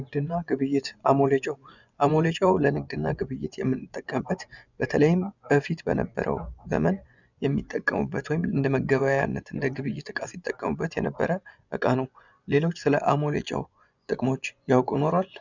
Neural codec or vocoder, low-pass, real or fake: vocoder, 24 kHz, 100 mel bands, Vocos; 7.2 kHz; fake